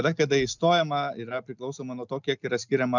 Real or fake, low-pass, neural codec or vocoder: real; 7.2 kHz; none